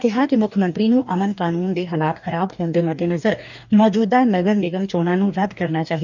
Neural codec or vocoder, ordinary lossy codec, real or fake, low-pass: codec, 44.1 kHz, 2.6 kbps, DAC; none; fake; 7.2 kHz